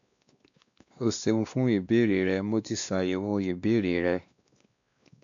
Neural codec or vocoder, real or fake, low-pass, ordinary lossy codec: codec, 16 kHz, 2 kbps, X-Codec, WavLM features, trained on Multilingual LibriSpeech; fake; 7.2 kHz; MP3, 64 kbps